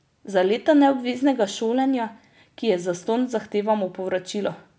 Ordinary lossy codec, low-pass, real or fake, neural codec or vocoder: none; none; real; none